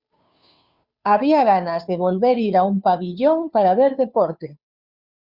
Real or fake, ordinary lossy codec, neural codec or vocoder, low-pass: fake; Opus, 64 kbps; codec, 16 kHz, 2 kbps, FunCodec, trained on Chinese and English, 25 frames a second; 5.4 kHz